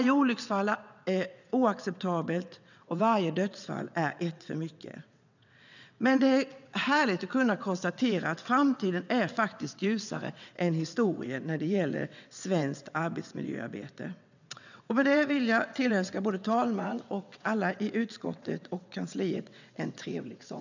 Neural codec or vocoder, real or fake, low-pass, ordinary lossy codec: vocoder, 22.05 kHz, 80 mel bands, WaveNeXt; fake; 7.2 kHz; none